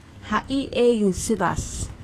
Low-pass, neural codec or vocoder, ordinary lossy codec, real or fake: 14.4 kHz; codec, 44.1 kHz, 2.6 kbps, SNAC; AAC, 48 kbps; fake